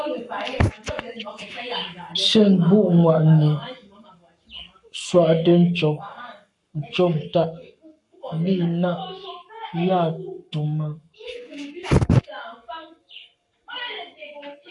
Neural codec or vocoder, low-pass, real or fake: codec, 44.1 kHz, 7.8 kbps, Pupu-Codec; 10.8 kHz; fake